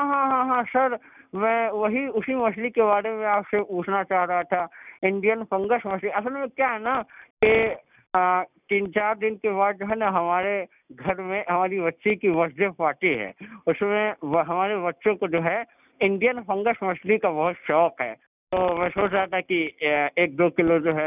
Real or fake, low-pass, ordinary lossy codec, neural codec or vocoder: real; 3.6 kHz; none; none